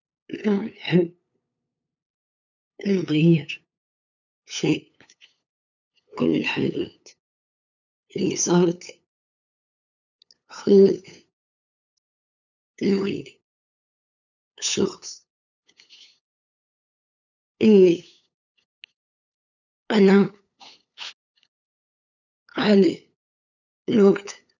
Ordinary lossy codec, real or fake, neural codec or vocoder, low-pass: none; fake; codec, 16 kHz, 8 kbps, FunCodec, trained on LibriTTS, 25 frames a second; 7.2 kHz